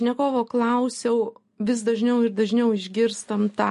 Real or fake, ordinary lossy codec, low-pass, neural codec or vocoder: real; MP3, 48 kbps; 14.4 kHz; none